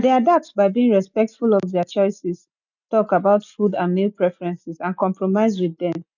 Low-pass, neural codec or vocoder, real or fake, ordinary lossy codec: 7.2 kHz; none; real; none